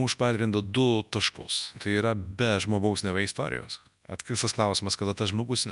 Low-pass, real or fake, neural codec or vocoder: 10.8 kHz; fake; codec, 24 kHz, 0.9 kbps, WavTokenizer, large speech release